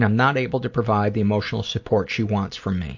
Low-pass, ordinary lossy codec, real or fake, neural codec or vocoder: 7.2 kHz; AAC, 48 kbps; real; none